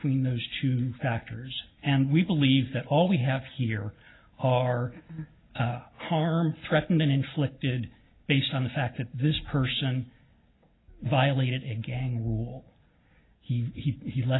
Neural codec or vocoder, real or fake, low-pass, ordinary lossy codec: none; real; 7.2 kHz; AAC, 16 kbps